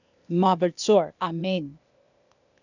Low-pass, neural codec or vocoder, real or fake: 7.2 kHz; codec, 16 kHz, 0.8 kbps, ZipCodec; fake